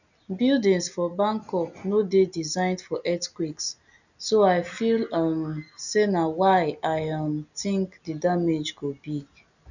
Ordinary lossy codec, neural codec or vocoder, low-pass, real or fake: none; none; 7.2 kHz; real